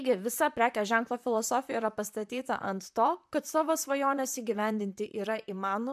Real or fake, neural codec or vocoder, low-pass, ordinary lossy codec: fake; vocoder, 44.1 kHz, 128 mel bands, Pupu-Vocoder; 14.4 kHz; MP3, 96 kbps